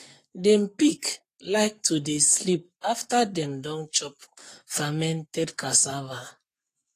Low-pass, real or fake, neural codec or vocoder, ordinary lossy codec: 14.4 kHz; fake; codec, 44.1 kHz, 7.8 kbps, Pupu-Codec; AAC, 48 kbps